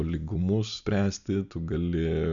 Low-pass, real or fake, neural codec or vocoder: 7.2 kHz; real; none